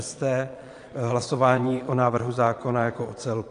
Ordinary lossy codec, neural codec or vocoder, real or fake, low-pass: AAC, 48 kbps; vocoder, 22.05 kHz, 80 mel bands, Vocos; fake; 9.9 kHz